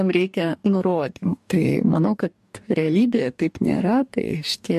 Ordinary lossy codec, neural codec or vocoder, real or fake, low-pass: MP3, 64 kbps; codec, 44.1 kHz, 2.6 kbps, DAC; fake; 14.4 kHz